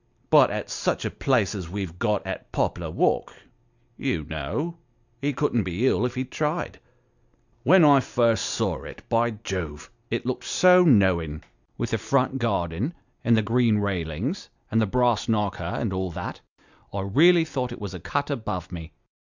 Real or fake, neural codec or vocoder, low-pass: real; none; 7.2 kHz